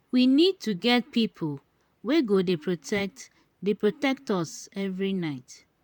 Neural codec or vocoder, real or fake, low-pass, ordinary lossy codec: vocoder, 44.1 kHz, 128 mel bands, Pupu-Vocoder; fake; 19.8 kHz; MP3, 96 kbps